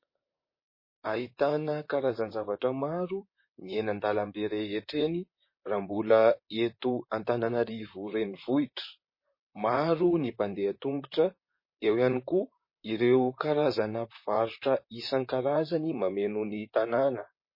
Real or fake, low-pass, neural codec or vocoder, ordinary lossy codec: fake; 5.4 kHz; vocoder, 44.1 kHz, 128 mel bands, Pupu-Vocoder; MP3, 24 kbps